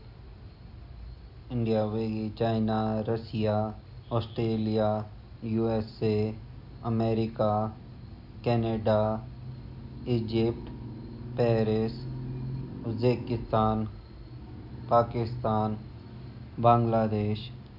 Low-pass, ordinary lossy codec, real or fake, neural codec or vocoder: 5.4 kHz; none; real; none